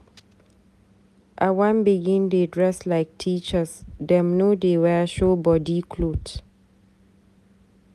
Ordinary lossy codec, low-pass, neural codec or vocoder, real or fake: none; 14.4 kHz; none; real